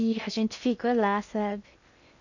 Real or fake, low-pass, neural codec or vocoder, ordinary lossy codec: fake; 7.2 kHz; codec, 16 kHz in and 24 kHz out, 0.8 kbps, FocalCodec, streaming, 65536 codes; none